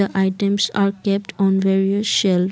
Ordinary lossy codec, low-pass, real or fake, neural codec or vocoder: none; none; real; none